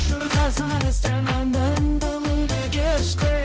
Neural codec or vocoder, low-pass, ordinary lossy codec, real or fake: codec, 16 kHz, 0.5 kbps, X-Codec, HuBERT features, trained on balanced general audio; none; none; fake